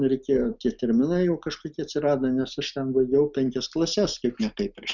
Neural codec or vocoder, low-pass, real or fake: none; 7.2 kHz; real